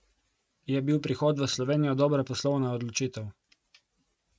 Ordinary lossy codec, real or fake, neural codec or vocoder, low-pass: none; real; none; none